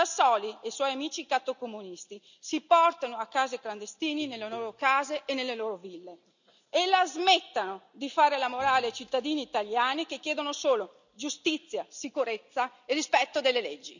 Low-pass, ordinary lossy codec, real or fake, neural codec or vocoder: 7.2 kHz; none; real; none